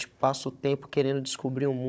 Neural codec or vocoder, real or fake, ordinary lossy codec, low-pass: codec, 16 kHz, 16 kbps, FunCodec, trained on Chinese and English, 50 frames a second; fake; none; none